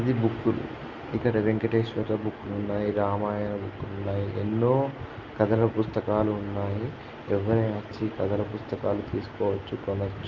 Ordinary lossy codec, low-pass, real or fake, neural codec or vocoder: Opus, 32 kbps; 7.2 kHz; real; none